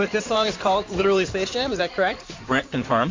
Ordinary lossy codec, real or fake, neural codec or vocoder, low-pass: MP3, 48 kbps; fake; codec, 16 kHz in and 24 kHz out, 2.2 kbps, FireRedTTS-2 codec; 7.2 kHz